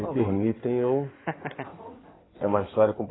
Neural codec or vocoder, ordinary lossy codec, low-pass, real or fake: codec, 16 kHz in and 24 kHz out, 2.2 kbps, FireRedTTS-2 codec; AAC, 16 kbps; 7.2 kHz; fake